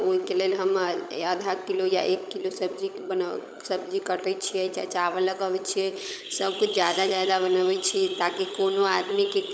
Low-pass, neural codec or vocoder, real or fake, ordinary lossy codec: none; codec, 16 kHz, 16 kbps, FunCodec, trained on LibriTTS, 50 frames a second; fake; none